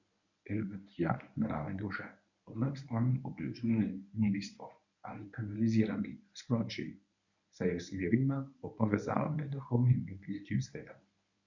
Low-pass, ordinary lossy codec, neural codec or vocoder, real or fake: 7.2 kHz; none; codec, 24 kHz, 0.9 kbps, WavTokenizer, medium speech release version 1; fake